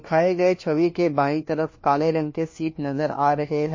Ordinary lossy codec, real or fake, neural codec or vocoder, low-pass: MP3, 32 kbps; fake; codec, 16 kHz, 1 kbps, FunCodec, trained on LibriTTS, 50 frames a second; 7.2 kHz